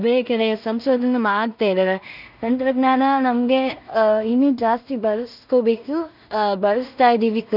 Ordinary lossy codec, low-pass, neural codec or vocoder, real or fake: none; 5.4 kHz; codec, 16 kHz in and 24 kHz out, 0.4 kbps, LongCat-Audio-Codec, two codebook decoder; fake